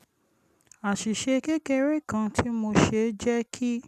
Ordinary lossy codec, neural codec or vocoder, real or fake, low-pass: none; none; real; 14.4 kHz